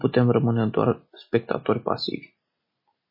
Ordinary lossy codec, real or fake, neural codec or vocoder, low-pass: MP3, 24 kbps; real; none; 5.4 kHz